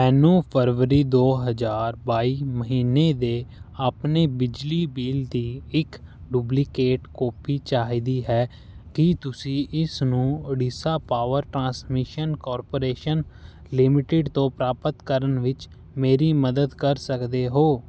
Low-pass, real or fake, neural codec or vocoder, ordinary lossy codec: none; real; none; none